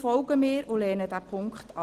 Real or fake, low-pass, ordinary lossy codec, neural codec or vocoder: real; 14.4 kHz; Opus, 16 kbps; none